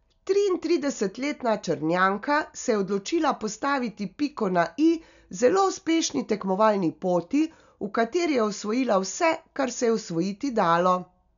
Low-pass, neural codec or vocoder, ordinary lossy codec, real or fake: 7.2 kHz; none; none; real